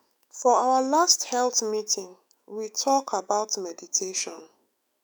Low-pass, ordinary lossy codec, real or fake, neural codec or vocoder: none; none; fake; autoencoder, 48 kHz, 128 numbers a frame, DAC-VAE, trained on Japanese speech